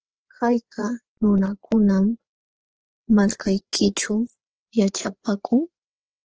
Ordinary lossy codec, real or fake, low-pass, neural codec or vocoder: Opus, 16 kbps; fake; 7.2 kHz; codec, 16 kHz, 4 kbps, FreqCodec, larger model